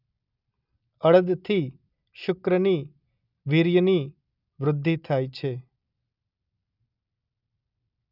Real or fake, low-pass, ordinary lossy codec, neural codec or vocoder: real; 5.4 kHz; none; none